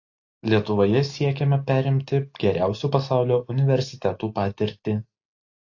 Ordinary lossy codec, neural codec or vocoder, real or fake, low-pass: AAC, 48 kbps; none; real; 7.2 kHz